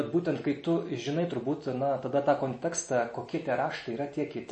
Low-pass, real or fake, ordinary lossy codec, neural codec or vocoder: 10.8 kHz; real; MP3, 32 kbps; none